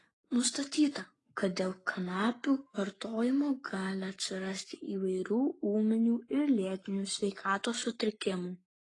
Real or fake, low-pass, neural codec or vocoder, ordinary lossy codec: fake; 10.8 kHz; codec, 44.1 kHz, 7.8 kbps, Pupu-Codec; AAC, 32 kbps